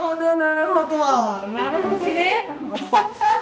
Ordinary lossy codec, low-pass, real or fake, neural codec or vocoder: none; none; fake; codec, 16 kHz, 1 kbps, X-Codec, HuBERT features, trained on balanced general audio